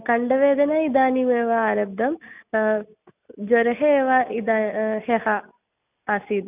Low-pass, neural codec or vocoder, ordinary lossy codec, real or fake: 3.6 kHz; none; none; real